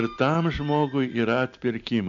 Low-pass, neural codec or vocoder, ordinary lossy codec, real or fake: 7.2 kHz; none; MP3, 96 kbps; real